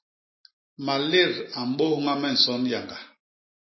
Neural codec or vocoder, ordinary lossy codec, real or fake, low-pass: none; MP3, 24 kbps; real; 7.2 kHz